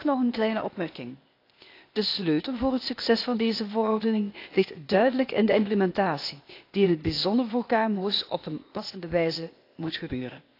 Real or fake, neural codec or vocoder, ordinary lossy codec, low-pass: fake; codec, 16 kHz, 0.8 kbps, ZipCodec; AAC, 32 kbps; 5.4 kHz